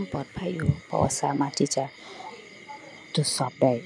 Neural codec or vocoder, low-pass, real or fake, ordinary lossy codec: none; none; real; none